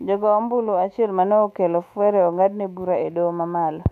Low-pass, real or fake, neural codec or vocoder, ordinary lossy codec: 14.4 kHz; fake; autoencoder, 48 kHz, 128 numbers a frame, DAC-VAE, trained on Japanese speech; none